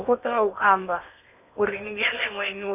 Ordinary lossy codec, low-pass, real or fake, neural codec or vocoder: none; 3.6 kHz; fake; codec, 16 kHz in and 24 kHz out, 0.8 kbps, FocalCodec, streaming, 65536 codes